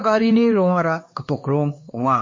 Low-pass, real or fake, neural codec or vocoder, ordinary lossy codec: 7.2 kHz; fake; codec, 16 kHz, 2 kbps, X-Codec, HuBERT features, trained on LibriSpeech; MP3, 32 kbps